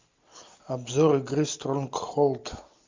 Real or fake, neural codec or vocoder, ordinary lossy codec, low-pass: real; none; MP3, 64 kbps; 7.2 kHz